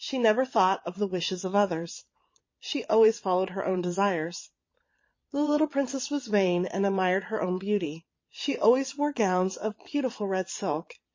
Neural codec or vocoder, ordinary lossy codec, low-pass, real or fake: vocoder, 22.05 kHz, 80 mel bands, Vocos; MP3, 32 kbps; 7.2 kHz; fake